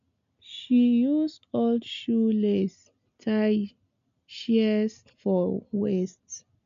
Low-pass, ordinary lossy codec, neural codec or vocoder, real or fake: 7.2 kHz; MP3, 64 kbps; none; real